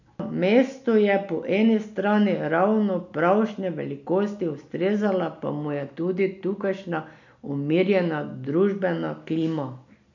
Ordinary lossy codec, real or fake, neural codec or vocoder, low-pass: none; real; none; 7.2 kHz